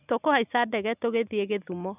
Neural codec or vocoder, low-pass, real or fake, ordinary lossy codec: none; 3.6 kHz; real; none